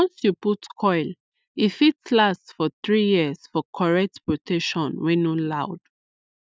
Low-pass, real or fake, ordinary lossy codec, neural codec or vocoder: none; real; none; none